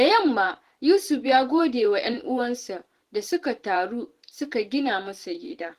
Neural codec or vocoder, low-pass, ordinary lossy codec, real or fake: vocoder, 44.1 kHz, 128 mel bands every 512 samples, BigVGAN v2; 14.4 kHz; Opus, 16 kbps; fake